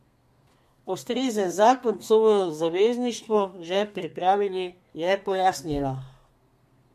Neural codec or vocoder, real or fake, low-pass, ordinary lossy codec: codec, 32 kHz, 1.9 kbps, SNAC; fake; 14.4 kHz; MP3, 64 kbps